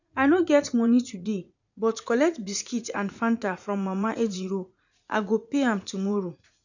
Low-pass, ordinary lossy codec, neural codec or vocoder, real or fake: 7.2 kHz; none; vocoder, 44.1 kHz, 80 mel bands, Vocos; fake